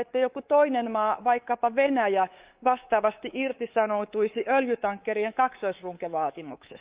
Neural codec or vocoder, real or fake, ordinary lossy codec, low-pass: codec, 24 kHz, 3.1 kbps, DualCodec; fake; Opus, 32 kbps; 3.6 kHz